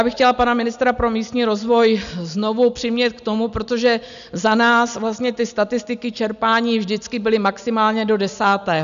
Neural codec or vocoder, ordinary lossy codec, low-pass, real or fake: none; MP3, 96 kbps; 7.2 kHz; real